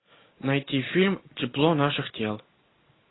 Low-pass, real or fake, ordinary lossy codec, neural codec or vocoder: 7.2 kHz; real; AAC, 16 kbps; none